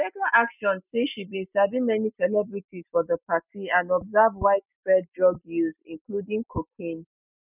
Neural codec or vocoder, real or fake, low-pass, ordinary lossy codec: none; real; 3.6 kHz; none